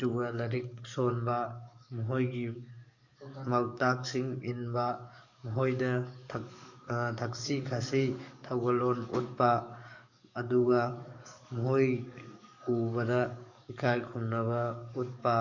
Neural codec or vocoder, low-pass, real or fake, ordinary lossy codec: codec, 44.1 kHz, 7.8 kbps, DAC; 7.2 kHz; fake; none